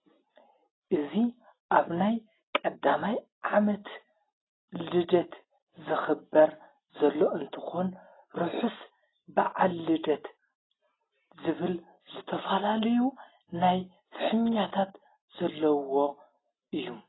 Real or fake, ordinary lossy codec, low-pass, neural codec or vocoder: real; AAC, 16 kbps; 7.2 kHz; none